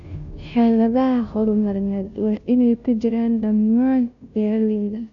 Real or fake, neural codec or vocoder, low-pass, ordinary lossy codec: fake; codec, 16 kHz, 0.5 kbps, FunCodec, trained on Chinese and English, 25 frames a second; 7.2 kHz; none